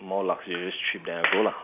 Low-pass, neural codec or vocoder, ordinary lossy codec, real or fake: 3.6 kHz; none; none; real